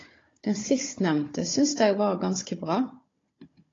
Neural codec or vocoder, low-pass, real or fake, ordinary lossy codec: codec, 16 kHz, 16 kbps, FunCodec, trained on Chinese and English, 50 frames a second; 7.2 kHz; fake; AAC, 32 kbps